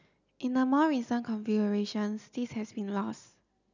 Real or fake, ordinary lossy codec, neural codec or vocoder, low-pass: real; none; none; 7.2 kHz